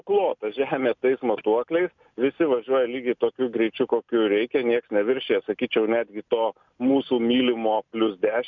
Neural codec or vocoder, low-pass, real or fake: none; 7.2 kHz; real